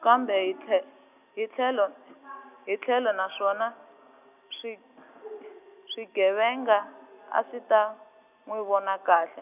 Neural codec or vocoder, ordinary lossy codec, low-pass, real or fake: none; none; 3.6 kHz; real